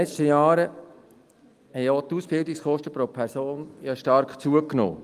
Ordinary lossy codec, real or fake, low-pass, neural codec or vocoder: Opus, 32 kbps; real; 14.4 kHz; none